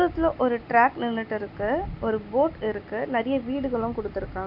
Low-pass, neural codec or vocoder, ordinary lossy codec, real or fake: 5.4 kHz; none; none; real